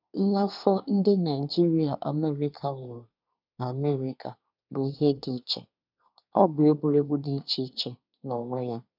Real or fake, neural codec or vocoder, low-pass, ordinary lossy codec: fake; codec, 24 kHz, 1 kbps, SNAC; 5.4 kHz; none